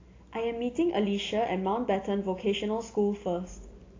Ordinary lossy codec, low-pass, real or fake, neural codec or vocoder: AAC, 32 kbps; 7.2 kHz; real; none